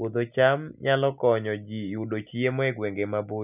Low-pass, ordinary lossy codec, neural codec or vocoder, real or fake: 3.6 kHz; none; none; real